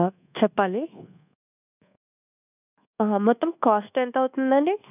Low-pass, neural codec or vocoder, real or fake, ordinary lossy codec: 3.6 kHz; codec, 24 kHz, 1.2 kbps, DualCodec; fake; none